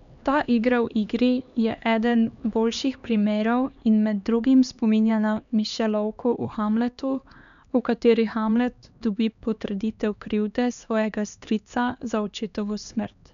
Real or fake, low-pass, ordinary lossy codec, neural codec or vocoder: fake; 7.2 kHz; none; codec, 16 kHz, 2 kbps, X-Codec, HuBERT features, trained on LibriSpeech